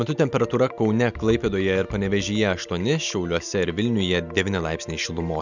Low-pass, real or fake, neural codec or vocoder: 7.2 kHz; real; none